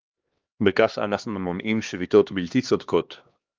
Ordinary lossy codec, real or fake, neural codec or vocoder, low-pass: Opus, 32 kbps; fake; codec, 16 kHz, 2 kbps, X-Codec, HuBERT features, trained on LibriSpeech; 7.2 kHz